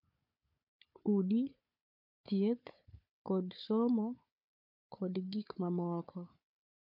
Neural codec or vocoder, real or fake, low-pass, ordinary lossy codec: codec, 16 kHz, 4 kbps, FunCodec, trained on Chinese and English, 50 frames a second; fake; 5.4 kHz; MP3, 48 kbps